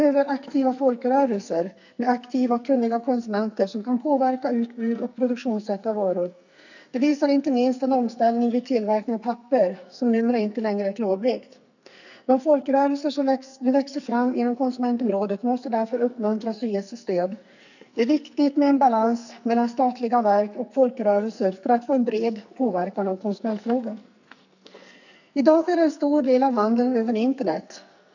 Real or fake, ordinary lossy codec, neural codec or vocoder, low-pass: fake; none; codec, 44.1 kHz, 2.6 kbps, SNAC; 7.2 kHz